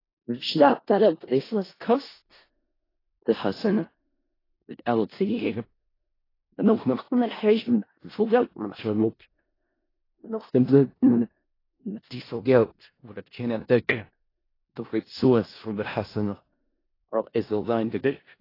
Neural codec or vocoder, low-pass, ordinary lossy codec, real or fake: codec, 16 kHz in and 24 kHz out, 0.4 kbps, LongCat-Audio-Codec, four codebook decoder; 5.4 kHz; AAC, 24 kbps; fake